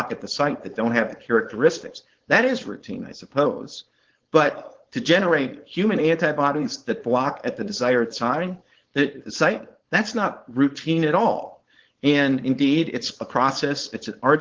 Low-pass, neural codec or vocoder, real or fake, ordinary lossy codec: 7.2 kHz; codec, 16 kHz, 4.8 kbps, FACodec; fake; Opus, 16 kbps